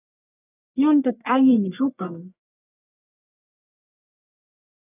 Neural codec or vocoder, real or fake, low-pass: codec, 44.1 kHz, 1.7 kbps, Pupu-Codec; fake; 3.6 kHz